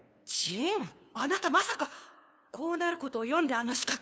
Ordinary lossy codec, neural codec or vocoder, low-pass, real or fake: none; codec, 16 kHz, 2 kbps, FunCodec, trained on LibriTTS, 25 frames a second; none; fake